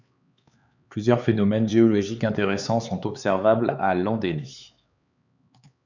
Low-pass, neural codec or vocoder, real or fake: 7.2 kHz; codec, 16 kHz, 4 kbps, X-Codec, HuBERT features, trained on LibriSpeech; fake